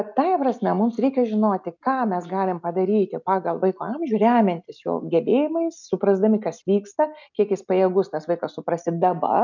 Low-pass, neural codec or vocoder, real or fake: 7.2 kHz; none; real